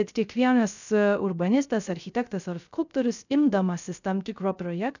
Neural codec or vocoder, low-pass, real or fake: codec, 16 kHz, 0.3 kbps, FocalCodec; 7.2 kHz; fake